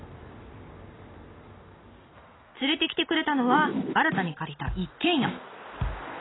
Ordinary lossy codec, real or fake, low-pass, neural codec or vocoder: AAC, 16 kbps; real; 7.2 kHz; none